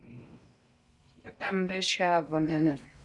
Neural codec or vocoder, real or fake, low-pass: codec, 16 kHz in and 24 kHz out, 0.6 kbps, FocalCodec, streaming, 4096 codes; fake; 10.8 kHz